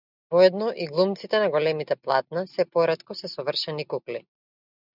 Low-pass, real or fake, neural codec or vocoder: 5.4 kHz; real; none